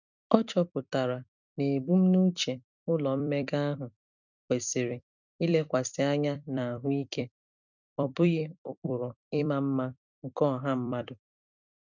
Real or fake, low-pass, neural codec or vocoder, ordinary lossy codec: fake; 7.2 kHz; vocoder, 44.1 kHz, 128 mel bands every 256 samples, BigVGAN v2; none